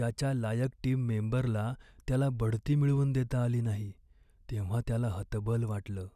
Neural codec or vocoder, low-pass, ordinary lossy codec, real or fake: none; 14.4 kHz; none; real